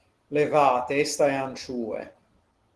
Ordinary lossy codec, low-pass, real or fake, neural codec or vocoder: Opus, 16 kbps; 10.8 kHz; real; none